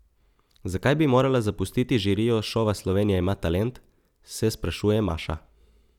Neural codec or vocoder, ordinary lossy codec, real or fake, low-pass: none; none; real; 19.8 kHz